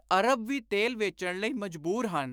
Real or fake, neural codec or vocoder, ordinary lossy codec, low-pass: fake; autoencoder, 48 kHz, 128 numbers a frame, DAC-VAE, trained on Japanese speech; none; none